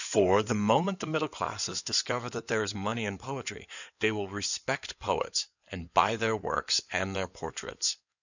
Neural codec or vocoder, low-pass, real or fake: codec, 16 kHz in and 24 kHz out, 2.2 kbps, FireRedTTS-2 codec; 7.2 kHz; fake